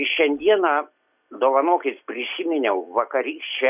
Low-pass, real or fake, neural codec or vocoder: 3.6 kHz; real; none